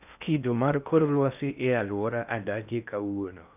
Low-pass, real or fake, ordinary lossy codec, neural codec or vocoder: 3.6 kHz; fake; none; codec, 16 kHz in and 24 kHz out, 0.6 kbps, FocalCodec, streaming, 2048 codes